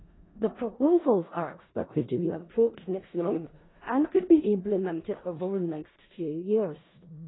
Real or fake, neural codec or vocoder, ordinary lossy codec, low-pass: fake; codec, 16 kHz in and 24 kHz out, 0.4 kbps, LongCat-Audio-Codec, four codebook decoder; AAC, 16 kbps; 7.2 kHz